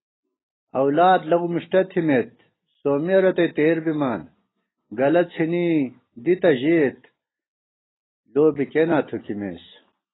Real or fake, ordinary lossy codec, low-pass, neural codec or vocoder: real; AAC, 16 kbps; 7.2 kHz; none